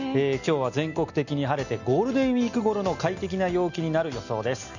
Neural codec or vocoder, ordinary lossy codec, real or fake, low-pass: none; none; real; 7.2 kHz